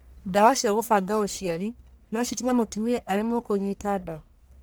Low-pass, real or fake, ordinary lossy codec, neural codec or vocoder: none; fake; none; codec, 44.1 kHz, 1.7 kbps, Pupu-Codec